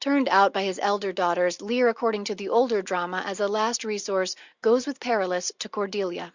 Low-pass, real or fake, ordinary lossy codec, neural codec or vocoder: 7.2 kHz; real; Opus, 64 kbps; none